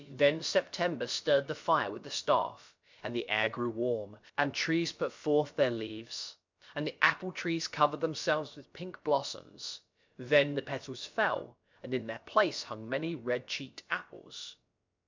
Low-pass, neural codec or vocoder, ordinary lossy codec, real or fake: 7.2 kHz; codec, 16 kHz, about 1 kbps, DyCAST, with the encoder's durations; MP3, 64 kbps; fake